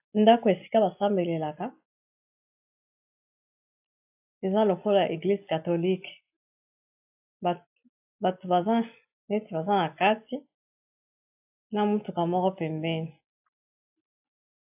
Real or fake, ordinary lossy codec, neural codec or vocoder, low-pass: real; AAC, 32 kbps; none; 3.6 kHz